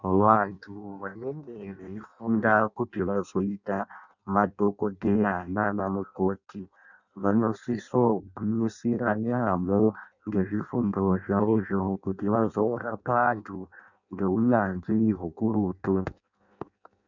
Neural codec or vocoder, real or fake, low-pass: codec, 16 kHz in and 24 kHz out, 0.6 kbps, FireRedTTS-2 codec; fake; 7.2 kHz